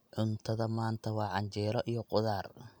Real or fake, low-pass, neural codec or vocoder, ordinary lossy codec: real; none; none; none